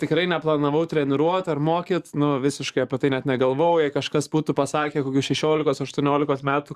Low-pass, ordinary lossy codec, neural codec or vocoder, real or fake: 14.4 kHz; Opus, 64 kbps; codec, 44.1 kHz, 7.8 kbps, DAC; fake